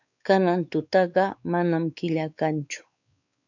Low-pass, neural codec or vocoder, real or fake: 7.2 kHz; codec, 16 kHz, 4 kbps, X-Codec, WavLM features, trained on Multilingual LibriSpeech; fake